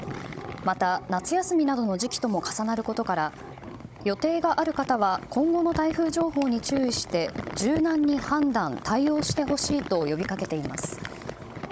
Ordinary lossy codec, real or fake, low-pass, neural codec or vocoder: none; fake; none; codec, 16 kHz, 16 kbps, FunCodec, trained on Chinese and English, 50 frames a second